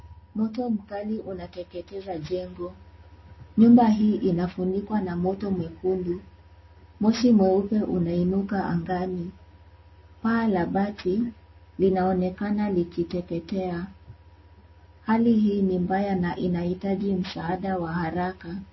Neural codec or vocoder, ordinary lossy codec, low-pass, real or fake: none; MP3, 24 kbps; 7.2 kHz; real